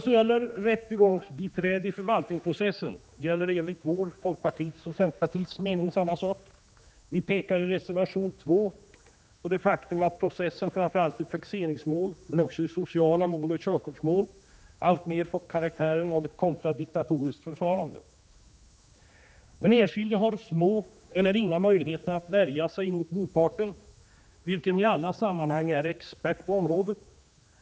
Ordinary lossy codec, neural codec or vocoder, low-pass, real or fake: none; codec, 16 kHz, 2 kbps, X-Codec, HuBERT features, trained on general audio; none; fake